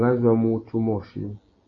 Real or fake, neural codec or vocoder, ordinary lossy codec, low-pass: real; none; AAC, 32 kbps; 7.2 kHz